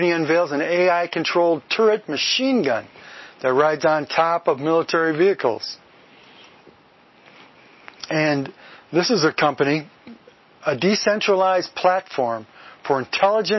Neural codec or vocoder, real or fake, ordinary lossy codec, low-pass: none; real; MP3, 24 kbps; 7.2 kHz